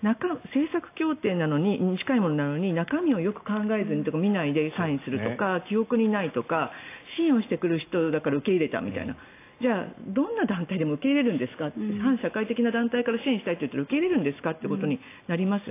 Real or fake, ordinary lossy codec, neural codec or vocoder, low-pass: real; AAC, 24 kbps; none; 3.6 kHz